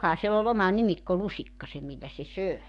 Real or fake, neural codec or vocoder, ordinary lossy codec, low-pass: fake; codec, 44.1 kHz, 7.8 kbps, DAC; none; 10.8 kHz